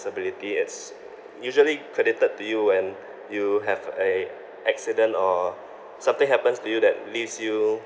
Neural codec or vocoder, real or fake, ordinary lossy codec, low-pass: none; real; none; none